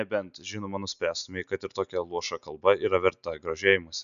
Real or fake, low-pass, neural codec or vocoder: real; 7.2 kHz; none